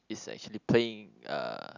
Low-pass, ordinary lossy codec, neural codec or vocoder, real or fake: 7.2 kHz; none; none; real